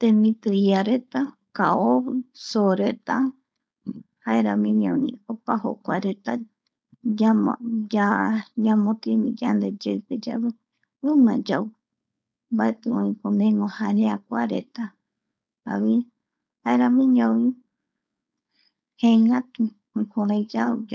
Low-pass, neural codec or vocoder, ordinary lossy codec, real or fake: none; codec, 16 kHz, 4.8 kbps, FACodec; none; fake